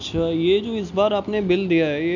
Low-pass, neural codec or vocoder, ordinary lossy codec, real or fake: 7.2 kHz; none; none; real